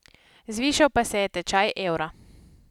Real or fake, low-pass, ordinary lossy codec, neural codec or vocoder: real; 19.8 kHz; none; none